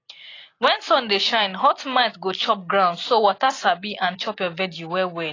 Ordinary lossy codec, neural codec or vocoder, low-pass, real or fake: AAC, 32 kbps; none; 7.2 kHz; real